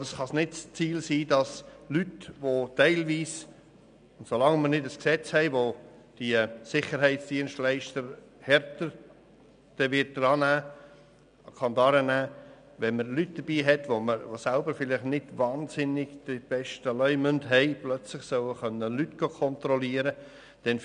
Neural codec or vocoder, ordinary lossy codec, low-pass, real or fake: none; none; 9.9 kHz; real